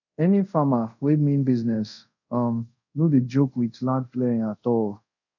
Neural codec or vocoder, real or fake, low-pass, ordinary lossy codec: codec, 24 kHz, 0.5 kbps, DualCodec; fake; 7.2 kHz; none